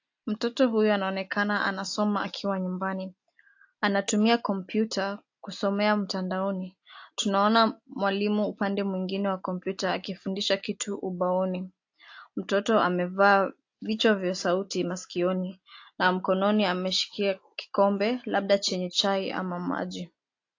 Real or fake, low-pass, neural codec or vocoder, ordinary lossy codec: real; 7.2 kHz; none; AAC, 48 kbps